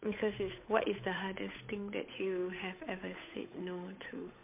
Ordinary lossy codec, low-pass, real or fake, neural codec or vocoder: MP3, 24 kbps; 3.6 kHz; fake; codec, 16 kHz, 8 kbps, FunCodec, trained on Chinese and English, 25 frames a second